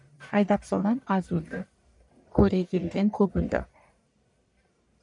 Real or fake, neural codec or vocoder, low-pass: fake; codec, 44.1 kHz, 1.7 kbps, Pupu-Codec; 10.8 kHz